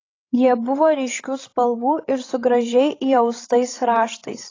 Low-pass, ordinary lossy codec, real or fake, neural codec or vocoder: 7.2 kHz; AAC, 32 kbps; fake; codec, 16 kHz, 16 kbps, FreqCodec, larger model